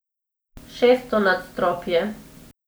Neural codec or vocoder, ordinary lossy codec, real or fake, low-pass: none; none; real; none